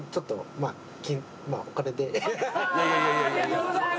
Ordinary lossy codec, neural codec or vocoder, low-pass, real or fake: none; none; none; real